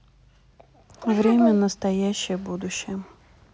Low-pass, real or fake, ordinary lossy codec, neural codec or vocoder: none; real; none; none